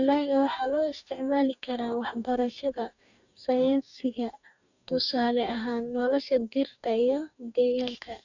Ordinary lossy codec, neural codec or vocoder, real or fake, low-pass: none; codec, 44.1 kHz, 2.6 kbps, DAC; fake; 7.2 kHz